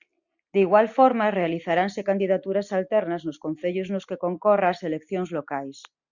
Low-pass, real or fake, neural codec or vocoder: 7.2 kHz; real; none